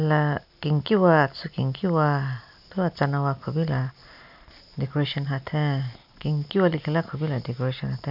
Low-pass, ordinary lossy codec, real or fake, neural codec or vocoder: 5.4 kHz; none; real; none